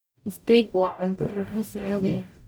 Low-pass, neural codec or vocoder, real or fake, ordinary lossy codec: none; codec, 44.1 kHz, 0.9 kbps, DAC; fake; none